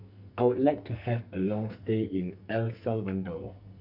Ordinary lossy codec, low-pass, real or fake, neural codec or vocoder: none; 5.4 kHz; fake; codec, 44.1 kHz, 2.6 kbps, SNAC